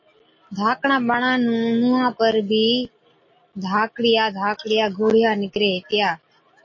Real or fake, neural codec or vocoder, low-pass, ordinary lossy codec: real; none; 7.2 kHz; MP3, 32 kbps